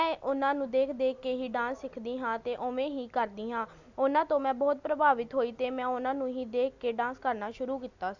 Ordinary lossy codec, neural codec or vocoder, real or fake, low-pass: Opus, 64 kbps; none; real; 7.2 kHz